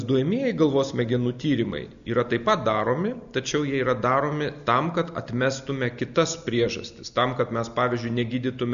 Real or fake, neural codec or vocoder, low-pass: real; none; 7.2 kHz